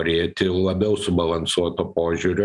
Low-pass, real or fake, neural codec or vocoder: 10.8 kHz; real; none